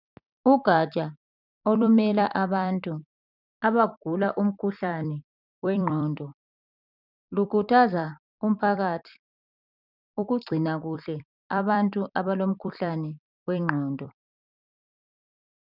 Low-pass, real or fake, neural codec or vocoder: 5.4 kHz; fake; vocoder, 44.1 kHz, 128 mel bands every 256 samples, BigVGAN v2